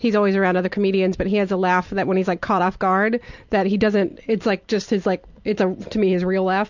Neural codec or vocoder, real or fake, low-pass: none; real; 7.2 kHz